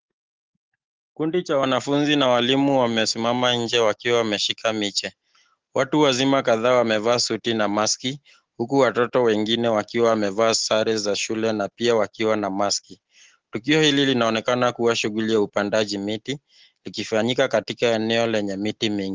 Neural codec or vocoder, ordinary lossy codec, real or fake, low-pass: none; Opus, 16 kbps; real; 7.2 kHz